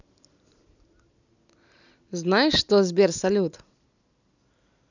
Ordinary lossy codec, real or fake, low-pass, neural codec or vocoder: none; real; 7.2 kHz; none